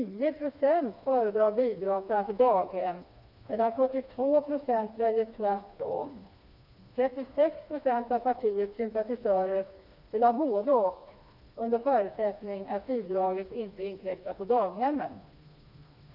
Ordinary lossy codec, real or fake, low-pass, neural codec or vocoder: none; fake; 5.4 kHz; codec, 16 kHz, 2 kbps, FreqCodec, smaller model